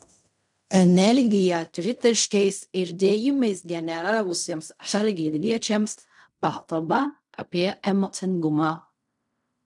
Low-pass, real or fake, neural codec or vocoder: 10.8 kHz; fake; codec, 16 kHz in and 24 kHz out, 0.4 kbps, LongCat-Audio-Codec, fine tuned four codebook decoder